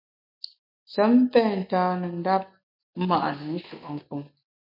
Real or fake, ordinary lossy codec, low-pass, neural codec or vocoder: real; MP3, 32 kbps; 5.4 kHz; none